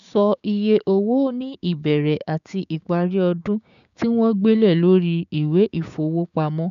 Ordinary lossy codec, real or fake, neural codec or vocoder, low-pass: none; fake; codec, 16 kHz, 6 kbps, DAC; 7.2 kHz